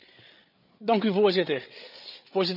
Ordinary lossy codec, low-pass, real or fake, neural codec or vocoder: none; 5.4 kHz; fake; codec, 16 kHz, 8 kbps, FreqCodec, larger model